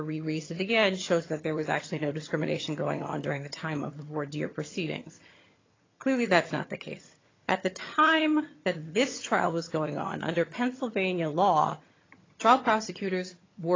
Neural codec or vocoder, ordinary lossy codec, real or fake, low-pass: vocoder, 22.05 kHz, 80 mel bands, HiFi-GAN; AAC, 32 kbps; fake; 7.2 kHz